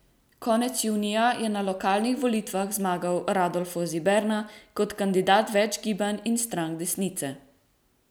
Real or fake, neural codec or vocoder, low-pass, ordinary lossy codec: real; none; none; none